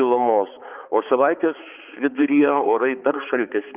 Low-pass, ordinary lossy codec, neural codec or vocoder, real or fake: 3.6 kHz; Opus, 24 kbps; codec, 16 kHz, 4 kbps, X-Codec, HuBERT features, trained on balanced general audio; fake